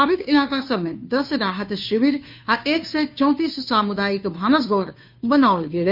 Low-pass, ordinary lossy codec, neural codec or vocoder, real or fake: 5.4 kHz; none; codec, 16 kHz, 2 kbps, FunCodec, trained on Chinese and English, 25 frames a second; fake